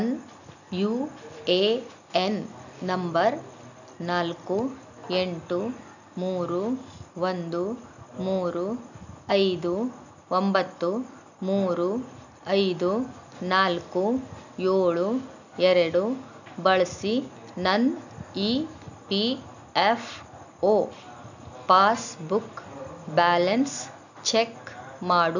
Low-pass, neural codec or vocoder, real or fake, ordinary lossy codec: 7.2 kHz; none; real; none